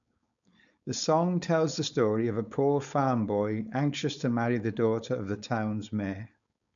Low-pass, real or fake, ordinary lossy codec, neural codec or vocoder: 7.2 kHz; fake; none; codec, 16 kHz, 4.8 kbps, FACodec